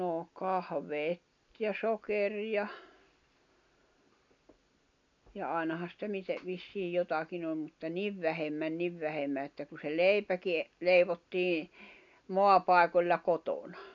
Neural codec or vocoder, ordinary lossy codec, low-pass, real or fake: none; none; 7.2 kHz; real